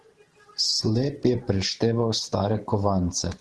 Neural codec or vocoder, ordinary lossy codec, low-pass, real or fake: vocoder, 44.1 kHz, 128 mel bands every 512 samples, BigVGAN v2; Opus, 16 kbps; 10.8 kHz; fake